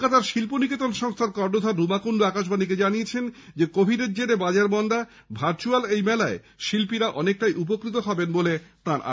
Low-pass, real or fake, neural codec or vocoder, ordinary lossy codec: none; real; none; none